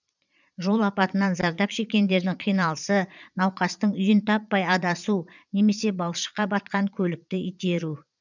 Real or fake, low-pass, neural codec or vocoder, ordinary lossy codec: fake; 7.2 kHz; vocoder, 22.05 kHz, 80 mel bands, Vocos; none